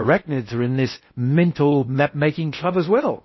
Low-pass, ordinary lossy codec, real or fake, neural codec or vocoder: 7.2 kHz; MP3, 24 kbps; fake; codec, 16 kHz in and 24 kHz out, 0.6 kbps, FocalCodec, streaming, 2048 codes